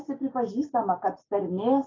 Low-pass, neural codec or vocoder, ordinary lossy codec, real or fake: 7.2 kHz; none; AAC, 32 kbps; real